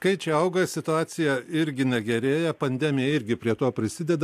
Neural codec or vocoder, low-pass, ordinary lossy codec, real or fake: none; 14.4 kHz; AAC, 96 kbps; real